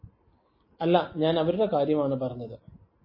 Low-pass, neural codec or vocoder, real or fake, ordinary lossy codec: 5.4 kHz; none; real; MP3, 24 kbps